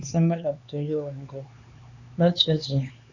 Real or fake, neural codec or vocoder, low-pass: fake; codec, 16 kHz, 4 kbps, X-Codec, HuBERT features, trained on LibriSpeech; 7.2 kHz